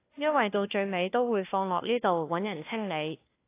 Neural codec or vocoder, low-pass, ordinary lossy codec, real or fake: codec, 16 kHz, 1 kbps, FunCodec, trained on LibriTTS, 50 frames a second; 3.6 kHz; AAC, 24 kbps; fake